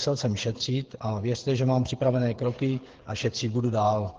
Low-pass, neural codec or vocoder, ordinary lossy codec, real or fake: 7.2 kHz; codec, 16 kHz, 8 kbps, FreqCodec, smaller model; Opus, 16 kbps; fake